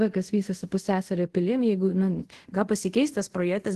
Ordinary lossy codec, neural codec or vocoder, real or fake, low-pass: Opus, 16 kbps; codec, 24 kHz, 0.5 kbps, DualCodec; fake; 10.8 kHz